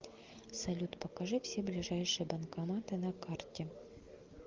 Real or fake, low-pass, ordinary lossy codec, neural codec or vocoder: real; 7.2 kHz; Opus, 32 kbps; none